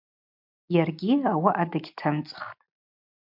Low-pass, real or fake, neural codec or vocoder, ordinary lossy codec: 5.4 kHz; real; none; MP3, 48 kbps